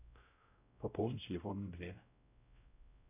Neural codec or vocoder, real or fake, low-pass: codec, 16 kHz, 0.5 kbps, X-Codec, WavLM features, trained on Multilingual LibriSpeech; fake; 3.6 kHz